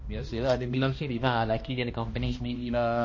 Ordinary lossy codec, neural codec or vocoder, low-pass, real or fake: MP3, 32 kbps; codec, 16 kHz, 1 kbps, X-Codec, HuBERT features, trained on balanced general audio; 7.2 kHz; fake